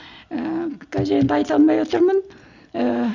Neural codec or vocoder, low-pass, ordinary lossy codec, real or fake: none; 7.2 kHz; Opus, 64 kbps; real